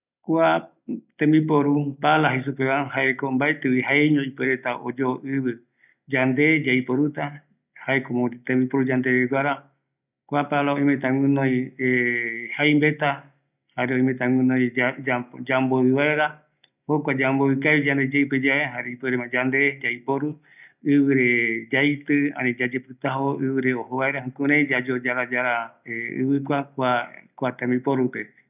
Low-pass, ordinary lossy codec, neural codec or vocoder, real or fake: 3.6 kHz; none; none; real